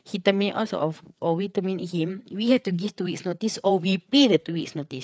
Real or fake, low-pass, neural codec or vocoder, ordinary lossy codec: fake; none; codec, 16 kHz, 4 kbps, FreqCodec, larger model; none